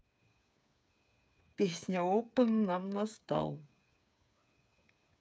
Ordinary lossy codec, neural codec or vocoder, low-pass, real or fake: none; codec, 16 kHz, 8 kbps, FreqCodec, smaller model; none; fake